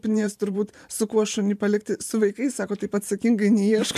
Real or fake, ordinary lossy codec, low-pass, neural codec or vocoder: fake; MP3, 96 kbps; 14.4 kHz; vocoder, 44.1 kHz, 128 mel bands every 512 samples, BigVGAN v2